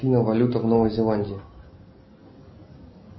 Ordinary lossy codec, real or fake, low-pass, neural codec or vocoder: MP3, 24 kbps; real; 7.2 kHz; none